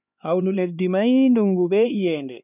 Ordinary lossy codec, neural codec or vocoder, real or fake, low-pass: none; codec, 16 kHz, 4 kbps, X-Codec, HuBERT features, trained on LibriSpeech; fake; 3.6 kHz